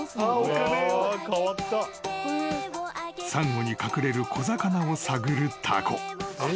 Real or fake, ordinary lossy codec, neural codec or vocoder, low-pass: real; none; none; none